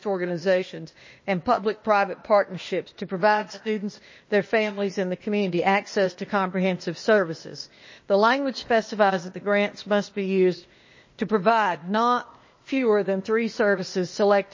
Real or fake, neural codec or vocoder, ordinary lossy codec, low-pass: fake; codec, 16 kHz, 0.8 kbps, ZipCodec; MP3, 32 kbps; 7.2 kHz